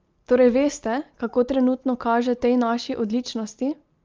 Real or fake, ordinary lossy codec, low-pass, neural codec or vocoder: real; Opus, 24 kbps; 7.2 kHz; none